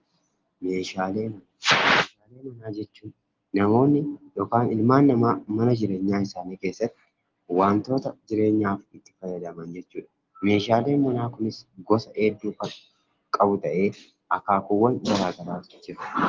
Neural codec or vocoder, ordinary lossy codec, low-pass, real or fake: none; Opus, 16 kbps; 7.2 kHz; real